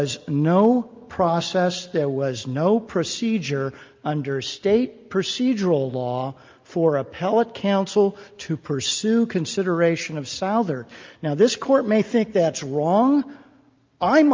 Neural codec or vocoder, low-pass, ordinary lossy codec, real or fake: none; 7.2 kHz; Opus, 24 kbps; real